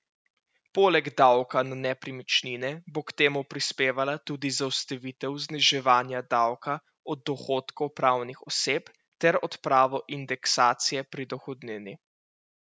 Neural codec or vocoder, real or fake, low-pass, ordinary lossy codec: none; real; none; none